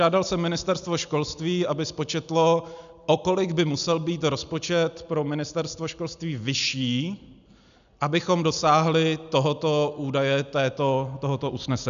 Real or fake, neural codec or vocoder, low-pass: real; none; 7.2 kHz